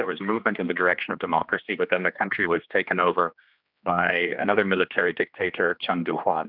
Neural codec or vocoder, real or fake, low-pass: codec, 16 kHz, 2 kbps, X-Codec, HuBERT features, trained on general audio; fake; 5.4 kHz